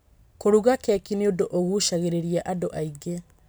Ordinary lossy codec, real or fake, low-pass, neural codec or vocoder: none; real; none; none